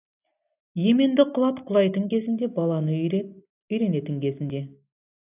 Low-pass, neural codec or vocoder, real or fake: 3.6 kHz; none; real